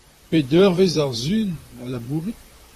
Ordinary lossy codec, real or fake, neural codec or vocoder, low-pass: AAC, 48 kbps; fake; vocoder, 44.1 kHz, 128 mel bands, Pupu-Vocoder; 14.4 kHz